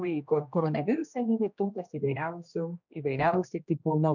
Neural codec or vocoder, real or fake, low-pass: codec, 16 kHz, 1 kbps, X-Codec, HuBERT features, trained on general audio; fake; 7.2 kHz